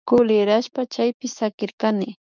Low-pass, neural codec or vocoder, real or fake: 7.2 kHz; codec, 16 kHz, 6 kbps, DAC; fake